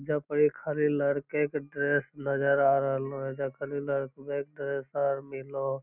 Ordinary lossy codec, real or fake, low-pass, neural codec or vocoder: none; real; 3.6 kHz; none